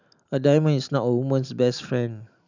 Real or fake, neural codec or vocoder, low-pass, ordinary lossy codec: real; none; 7.2 kHz; none